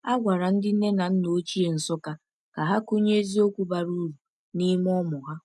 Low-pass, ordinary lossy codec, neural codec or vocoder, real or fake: none; none; none; real